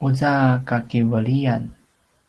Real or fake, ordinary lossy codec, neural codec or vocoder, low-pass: real; Opus, 16 kbps; none; 10.8 kHz